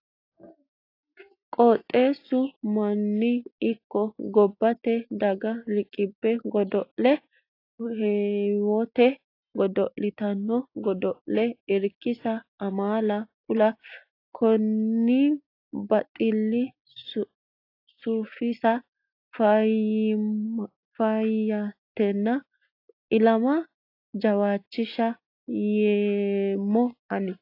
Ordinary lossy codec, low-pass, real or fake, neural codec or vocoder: AAC, 32 kbps; 5.4 kHz; real; none